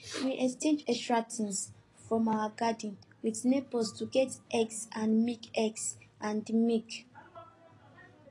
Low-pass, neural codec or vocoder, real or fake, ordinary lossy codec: 10.8 kHz; none; real; AAC, 32 kbps